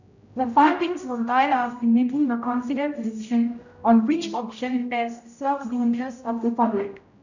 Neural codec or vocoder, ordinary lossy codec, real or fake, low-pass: codec, 16 kHz, 0.5 kbps, X-Codec, HuBERT features, trained on general audio; none; fake; 7.2 kHz